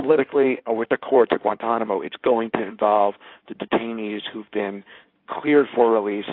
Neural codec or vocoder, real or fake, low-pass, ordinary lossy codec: codec, 16 kHz in and 24 kHz out, 2.2 kbps, FireRedTTS-2 codec; fake; 5.4 kHz; AAC, 32 kbps